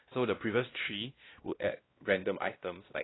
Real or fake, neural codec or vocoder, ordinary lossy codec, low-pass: fake; codec, 16 kHz, 1 kbps, X-Codec, WavLM features, trained on Multilingual LibriSpeech; AAC, 16 kbps; 7.2 kHz